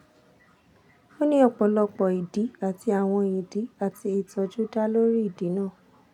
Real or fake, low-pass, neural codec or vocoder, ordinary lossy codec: real; 19.8 kHz; none; none